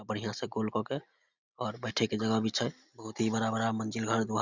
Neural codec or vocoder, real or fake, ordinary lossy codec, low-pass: none; real; Opus, 64 kbps; 7.2 kHz